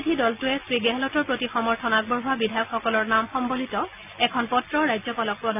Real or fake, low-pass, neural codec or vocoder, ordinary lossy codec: real; 3.6 kHz; none; none